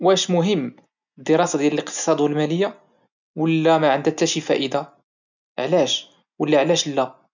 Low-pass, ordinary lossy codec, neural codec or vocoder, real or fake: 7.2 kHz; none; none; real